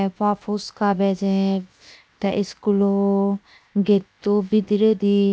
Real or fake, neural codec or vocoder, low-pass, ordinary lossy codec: fake; codec, 16 kHz, 0.7 kbps, FocalCodec; none; none